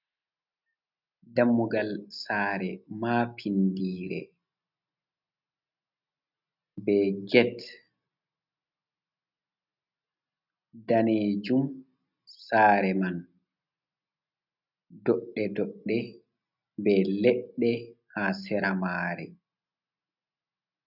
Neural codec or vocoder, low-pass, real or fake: none; 5.4 kHz; real